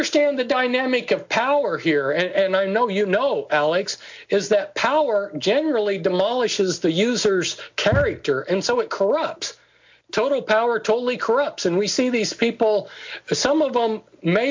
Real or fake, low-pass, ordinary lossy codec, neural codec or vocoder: real; 7.2 kHz; MP3, 64 kbps; none